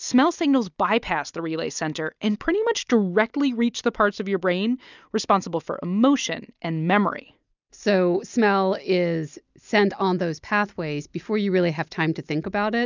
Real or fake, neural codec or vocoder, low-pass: real; none; 7.2 kHz